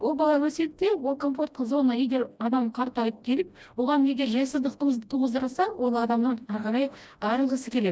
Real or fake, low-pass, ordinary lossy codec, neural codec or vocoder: fake; none; none; codec, 16 kHz, 1 kbps, FreqCodec, smaller model